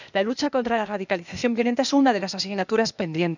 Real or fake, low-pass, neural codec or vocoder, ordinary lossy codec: fake; 7.2 kHz; codec, 16 kHz, 0.8 kbps, ZipCodec; none